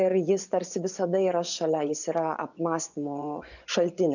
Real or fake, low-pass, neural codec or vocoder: fake; 7.2 kHz; vocoder, 24 kHz, 100 mel bands, Vocos